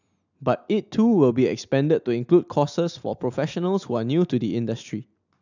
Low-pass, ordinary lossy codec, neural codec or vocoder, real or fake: 7.2 kHz; none; none; real